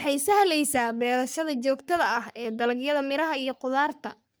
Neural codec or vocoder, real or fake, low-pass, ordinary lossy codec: codec, 44.1 kHz, 3.4 kbps, Pupu-Codec; fake; none; none